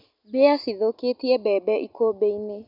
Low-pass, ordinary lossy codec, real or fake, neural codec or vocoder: 5.4 kHz; none; real; none